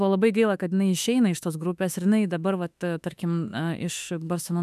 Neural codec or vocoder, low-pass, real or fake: autoencoder, 48 kHz, 32 numbers a frame, DAC-VAE, trained on Japanese speech; 14.4 kHz; fake